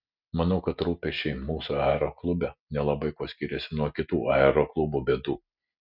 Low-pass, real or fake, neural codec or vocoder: 5.4 kHz; real; none